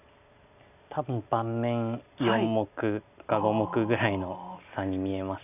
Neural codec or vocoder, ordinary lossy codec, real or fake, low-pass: none; none; real; 3.6 kHz